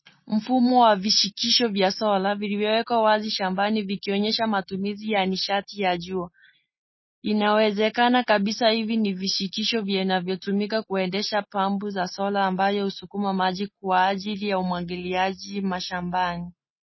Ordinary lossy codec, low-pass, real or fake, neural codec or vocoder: MP3, 24 kbps; 7.2 kHz; real; none